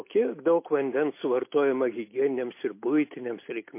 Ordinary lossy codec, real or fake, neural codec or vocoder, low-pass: MP3, 24 kbps; real; none; 3.6 kHz